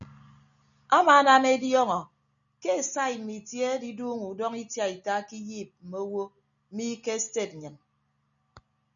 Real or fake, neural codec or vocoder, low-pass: real; none; 7.2 kHz